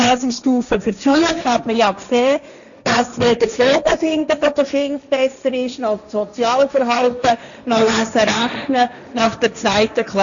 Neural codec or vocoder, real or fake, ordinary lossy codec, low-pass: codec, 16 kHz, 1.1 kbps, Voila-Tokenizer; fake; none; 7.2 kHz